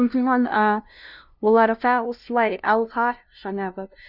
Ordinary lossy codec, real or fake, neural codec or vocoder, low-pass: none; fake; codec, 16 kHz, 0.5 kbps, FunCodec, trained on LibriTTS, 25 frames a second; 5.4 kHz